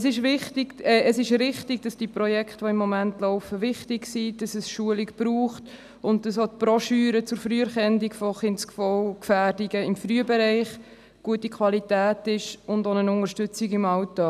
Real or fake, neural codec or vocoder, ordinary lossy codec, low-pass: real; none; none; 14.4 kHz